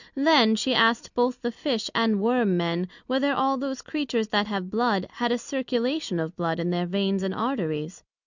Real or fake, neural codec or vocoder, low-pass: real; none; 7.2 kHz